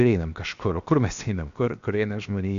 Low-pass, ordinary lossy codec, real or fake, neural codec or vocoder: 7.2 kHz; Opus, 64 kbps; fake; codec, 16 kHz, 0.7 kbps, FocalCodec